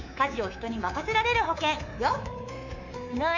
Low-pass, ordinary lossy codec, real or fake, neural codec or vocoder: 7.2 kHz; Opus, 64 kbps; fake; codec, 24 kHz, 3.1 kbps, DualCodec